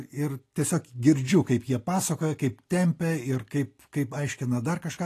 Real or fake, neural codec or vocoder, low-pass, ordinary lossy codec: real; none; 14.4 kHz; AAC, 48 kbps